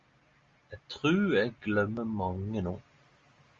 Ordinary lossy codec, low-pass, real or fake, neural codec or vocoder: Opus, 32 kbps; 7.2 kHz; real; none